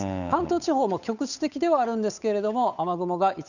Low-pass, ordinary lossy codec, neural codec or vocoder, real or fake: 7.2 kHz; none; codec, 16 kHz, 8 kbps, FunCodec, trained on Chinese and English, 25 frames a second; fake